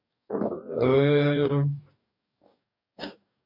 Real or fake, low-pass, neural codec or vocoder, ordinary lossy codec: fake; 5.4 kHz; codec, 44.1 kHz, 2.6 kbps, DAC; none